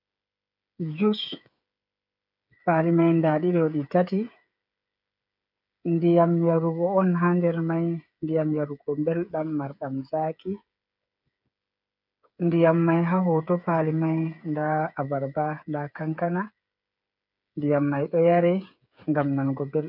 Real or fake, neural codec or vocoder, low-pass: fake; codec, 16 kHz, 8 kbps, FreqCodec, smaller model; 5.4 kHz